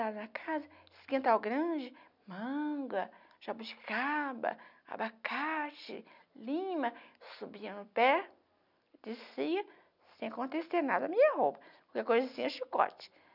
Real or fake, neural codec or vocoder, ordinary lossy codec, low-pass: real; none; none; 5.4 kHz